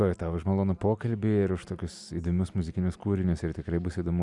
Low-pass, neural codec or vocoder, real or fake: 10.8 kHz; none; real